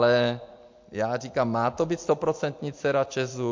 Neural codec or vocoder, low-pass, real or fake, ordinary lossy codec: vocoder, 44.1 kHz, 128 mel bands every 256 samples, BigVGAN v2; 7.2 kHz; fake; MP3, 48 kbps